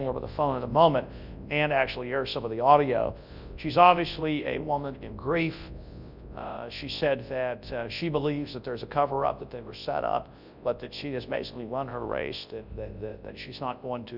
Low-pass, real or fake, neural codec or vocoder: 5.4 kHz; fake; codec, 24 kHz, 0.9 kbps, WavTokenizer, large speech release